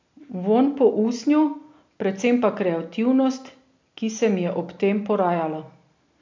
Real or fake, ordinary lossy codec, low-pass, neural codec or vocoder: real; MP3, 48 kbps; 7.2 kHz; none